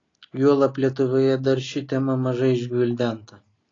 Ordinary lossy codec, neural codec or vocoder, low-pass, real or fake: AAC, 32 kbps; none; 7.2 kHz; real